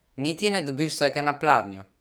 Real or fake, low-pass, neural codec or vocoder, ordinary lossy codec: fake; none; codec, 44.1 kHz, 2.6 kbps, SNAC; none